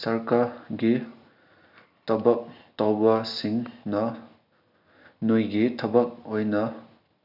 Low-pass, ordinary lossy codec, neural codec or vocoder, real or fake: 5.4 kHz; none; none; real